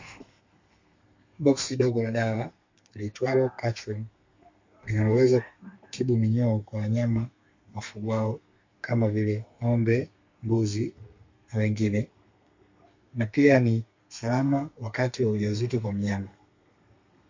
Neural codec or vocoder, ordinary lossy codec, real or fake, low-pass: codec, 32 kHz, 1.9 kbps, SNAC; MP3, 48 kbps; fake; 7.2 kHz